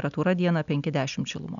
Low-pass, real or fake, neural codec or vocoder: 7.2 kHz; real; none